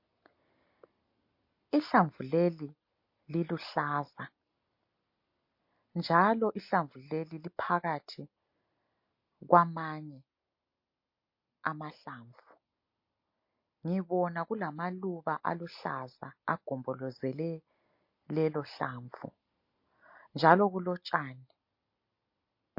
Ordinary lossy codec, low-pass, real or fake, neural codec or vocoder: MP3, 32 kbps; 5.4 kHz; real; none